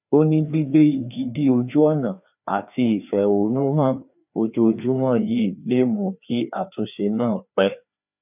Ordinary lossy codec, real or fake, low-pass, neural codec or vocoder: none; fake; 3.6 kHz; codec, 16 kHz, 2 kbps, FreqCodec, larger model